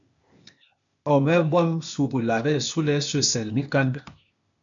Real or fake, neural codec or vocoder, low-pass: fake; codec, 16 kHz, 0.8 kbps, ZipCodec; 7.2 kHz